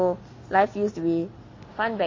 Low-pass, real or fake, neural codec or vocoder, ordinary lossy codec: 7.2 kHz; real; none; MP3, 32 kbps